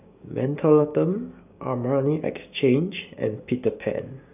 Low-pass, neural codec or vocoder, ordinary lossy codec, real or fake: 3.6 kHz; codec, 16 kHz, 6 kbps, DAC; none; fake